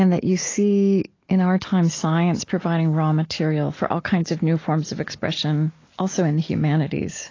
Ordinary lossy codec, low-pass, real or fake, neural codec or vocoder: AAC, 32 kbps; 7.2 kHz; real; none